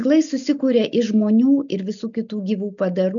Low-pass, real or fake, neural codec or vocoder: 7.2 kHz; real; none